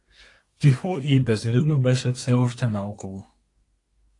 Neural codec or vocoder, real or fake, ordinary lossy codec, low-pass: codec, 24 kHz, 1 kbps, SNAC; fake; AAC, 48 kbps; 10.8 kHz